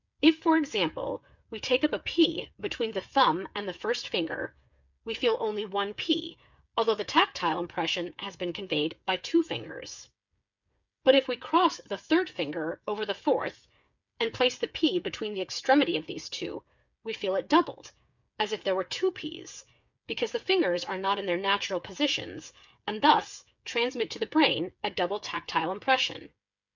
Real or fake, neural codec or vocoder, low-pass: fake; codec, 16 kHz, 8 kbps, FreqCodec, smaller model; 7.2 kHz